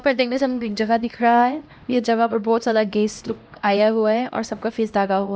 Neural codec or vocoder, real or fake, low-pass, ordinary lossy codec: codec, 16 kHz, 1 kbps, X-Codec, HuBERT features, trained on LibriSpeech; fake; none; none